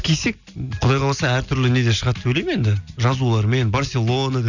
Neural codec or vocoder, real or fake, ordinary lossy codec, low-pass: none; real; none; 7.2 kHz